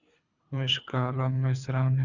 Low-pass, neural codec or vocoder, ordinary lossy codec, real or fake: 7.2 kHz; codec, 24 kHz, 3 kbps, HILCodec; Opus, 64 kbps; fake